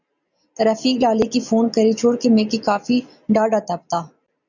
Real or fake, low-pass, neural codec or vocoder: real; 7.2 kHz; none